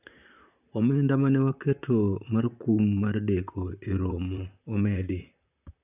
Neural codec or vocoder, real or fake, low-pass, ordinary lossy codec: vocoder, 44.1 kHz, 128 mel bands, Pupu-Vocoder; fake; 3.6 kHz; none